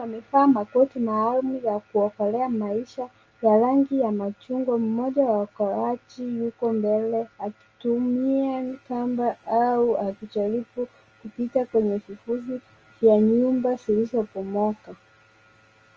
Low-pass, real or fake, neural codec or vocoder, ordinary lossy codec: 7.2 kHz; real; none; Opus, 24 kbps